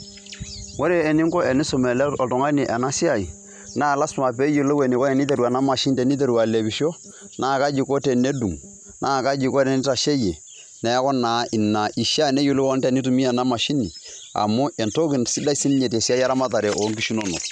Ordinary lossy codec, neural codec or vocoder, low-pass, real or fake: none; none; 9.9 kHz; real